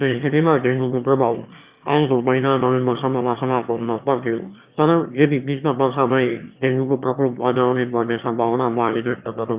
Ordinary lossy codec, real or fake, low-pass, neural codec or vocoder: Opus, 64 kbps; fake; 3.6 kHz; autoencoder, 22.05 kHz, a latent of 192 numbers a frame, VITS, trained on one speaker